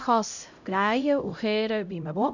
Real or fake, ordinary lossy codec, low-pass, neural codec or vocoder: fake; none; 7.2 kHz; codec, 16 kHz, 0.5 kbps, X-Codec, HuBERT features, trained on LibriSpeech